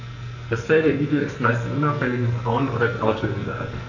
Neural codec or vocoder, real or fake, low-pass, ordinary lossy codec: codec, 44.1 kHz, 2.6 kbps, SNAC; fake; 7.2 kHz; none